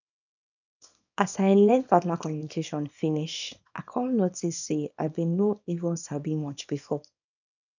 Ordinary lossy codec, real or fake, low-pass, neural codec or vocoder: none; fake; 7.2 kHz; codec, 24 kHz, 0.9 kbps, WavTokenizer, small release